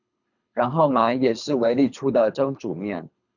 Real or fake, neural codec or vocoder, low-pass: fake; codec, 24 kHz, 3 kbps, HILCodec; 7.2 kHz